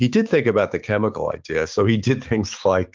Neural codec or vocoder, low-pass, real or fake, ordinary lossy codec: codec, 16 kHz, 6 kbps, DAC; 7.2 kHz; fake; Opus, 32 kbps